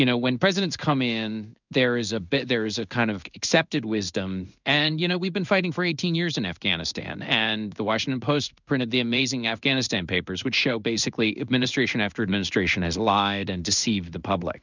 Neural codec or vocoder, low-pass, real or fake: codec, 16 kHz in and 24 kHz out, 1 kbps, XY-Tokenizer; 7.2 kHz; fake